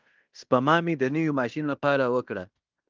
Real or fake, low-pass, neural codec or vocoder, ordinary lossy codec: fake; 7.2 kHz; codec, 16 kHz in and 24 kHz out, 0.9 kbps, LongCat-Audio-Codec, fine tuned four codebook decoder; Opus, 24 kbps